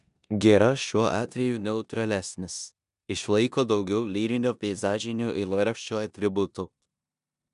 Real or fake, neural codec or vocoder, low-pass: fake; codec, 16 kHz in and 24 kHz out, 0.9 kbps, LongCat-Audio-Codec, four codebook decoder; 10.8 kHz